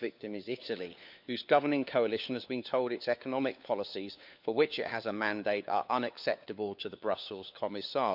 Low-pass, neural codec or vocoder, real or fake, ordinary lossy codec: 5.4 kHz; codec, 16 kHz, 4 kbps, FunCodec, trained on LibriTTS, 50 frames a second; fake; none